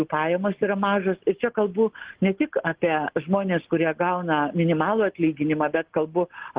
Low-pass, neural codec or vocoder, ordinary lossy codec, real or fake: 3.6 kHz; none; Opus, 16 kbps; real